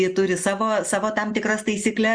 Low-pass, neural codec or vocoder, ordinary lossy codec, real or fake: 9.9 kHz; none; Opus, 64 kbps; real